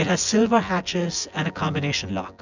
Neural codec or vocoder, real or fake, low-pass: vocoder, 24 kHz, 100 mel bands, Vocos; fake; 7.2 kHz